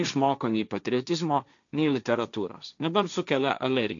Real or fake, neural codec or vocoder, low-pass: fake; codec, 16 kHz, 1.1 kbps, Voila-Tokenizer; 7.2 kHz